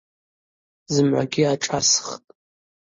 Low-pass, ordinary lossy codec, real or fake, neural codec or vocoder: 7.2 kHz; MP3, 32 kbps; real; none